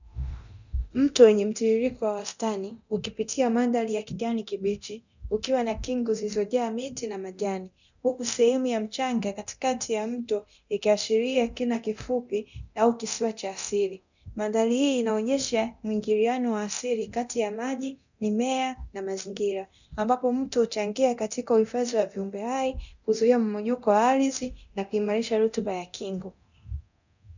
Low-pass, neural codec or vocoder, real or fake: 7.2 kHz; codec, 24 kHz, 0.9 kbps, DualCodec; fake